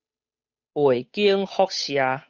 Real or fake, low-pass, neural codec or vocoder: fake; 7.2 kHz; codec, 16 kHz, 8 kbps, FunCodec, trained on Chinese and English, 25 frames a second